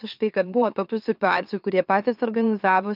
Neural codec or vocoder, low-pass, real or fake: autoencoder, 44.1 kHz, a latent of 192 numbers a frame, MeloTTS; 5.4 kHz; fake